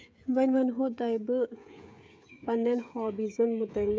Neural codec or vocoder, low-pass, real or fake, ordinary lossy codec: codec, 16 kHz, 16 kbps, FreqCodec, smaller model; none; fake; none